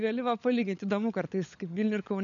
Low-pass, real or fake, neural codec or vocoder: 7.2 kHz; fake; codec, 16 kHz, 16 kbps, FunCodec, trained on LibriTTS, 50 frames a second